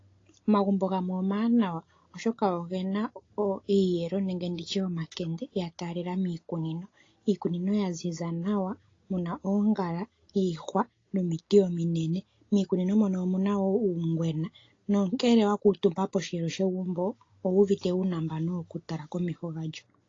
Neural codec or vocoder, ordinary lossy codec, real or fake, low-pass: none; AAC, 32 kbps; real; 7.2 kHz